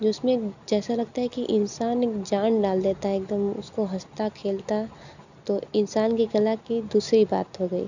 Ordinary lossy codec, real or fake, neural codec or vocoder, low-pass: none; real; none; 7.2 kHz